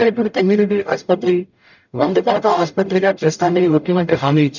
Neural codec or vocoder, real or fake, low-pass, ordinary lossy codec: codec, 44.1 kHz, 0.9 kbps, DAC; fake; 7.2 kHz; none